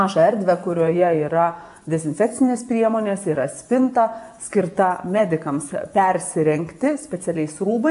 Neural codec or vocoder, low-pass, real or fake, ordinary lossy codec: vocoder, 24 kHz, 100 mel bands, Vocos; 10.8 kHz; fake; MP3, 96 kbps